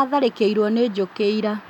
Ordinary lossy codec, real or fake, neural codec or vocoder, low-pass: none; real; none; 19.8 kHz